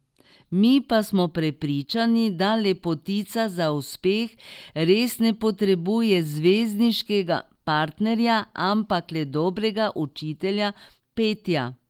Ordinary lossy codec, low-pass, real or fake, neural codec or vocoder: Opus, 24 kbps; 19.8 kHz; real; none